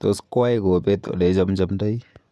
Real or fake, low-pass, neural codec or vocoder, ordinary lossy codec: fake; none; vocoder, 24 kHz, 100 mel bands, Vocos; none